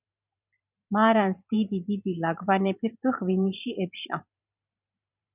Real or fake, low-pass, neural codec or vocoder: fake; 3.6 kHz; vocoder, 44.1 kHz, 128 mel bands every 512 samples, BigVGAN v2